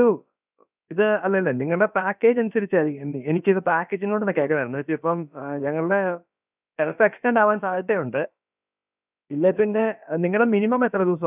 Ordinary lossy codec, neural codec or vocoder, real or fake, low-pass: none; codec, 16 kHz, about 1 kbps, DyCAST, with the encoder's durations; fake; 3.6 kHz